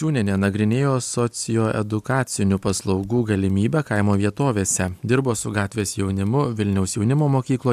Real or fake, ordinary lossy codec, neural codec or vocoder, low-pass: real; AAC, 96 kbps; none; 14.4 kHz